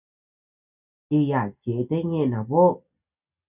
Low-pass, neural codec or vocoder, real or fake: 3.6 kHz; none; real